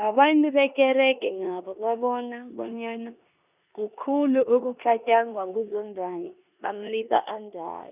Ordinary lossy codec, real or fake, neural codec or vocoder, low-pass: none; fake; codec, 16 kHz in and 24 kHz out, 0.9 kbps, LongCat-Audio-Codec, four codebook decoder; 3.6 kHz